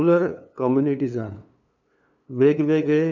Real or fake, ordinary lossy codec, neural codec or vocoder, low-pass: fake; none; codec, 16 kHz, 2 kbps, FunCodec, trained on LibriTTS, 25 frames a second; 7.2 kHz